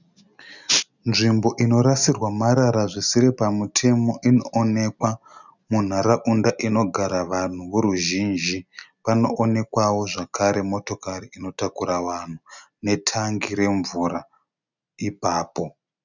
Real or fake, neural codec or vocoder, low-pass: real; none; 7.2 kHz